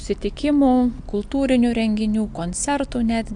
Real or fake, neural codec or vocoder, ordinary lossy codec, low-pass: real; none; AAC, 64 kbps; 9.9 kHz